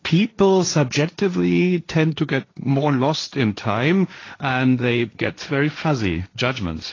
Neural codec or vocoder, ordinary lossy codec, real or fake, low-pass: codec, 16 kHz, 1.1 kbps, Voila-Tokenizer; AAC, 32 kbps; fake; 7.2 kHz